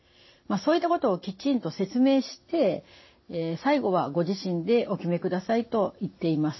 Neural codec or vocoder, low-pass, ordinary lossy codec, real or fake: none; 7.2 kHz; MP3, 24 kbps; real